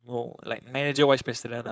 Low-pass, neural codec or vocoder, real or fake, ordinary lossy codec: none; codec, 16 kHz, 4.8 kbps, FACodec; fake; none